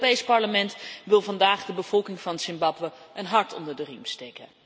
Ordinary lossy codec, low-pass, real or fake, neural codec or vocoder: none; none; real; none